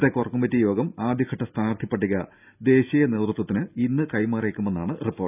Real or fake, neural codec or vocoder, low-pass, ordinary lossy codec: real; none; 3.6 kHz; none